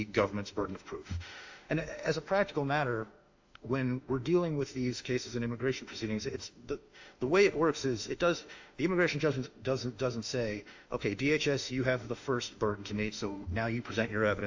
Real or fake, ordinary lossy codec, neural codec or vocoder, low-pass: fake; AAC, 48 kbps; autoencoder, 48 kHz, 32 numbers a frame, DAC-VAE, trained on Japanese speech; 7.2 kHz